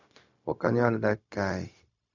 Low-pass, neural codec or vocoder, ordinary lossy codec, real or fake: 7.2 kHz; codec, 16 kHz, 0.4 kbps, LongCat-Audio-Codec; none; fake